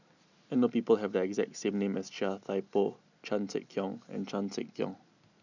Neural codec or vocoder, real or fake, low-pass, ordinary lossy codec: none; real; 7.2 kHz; none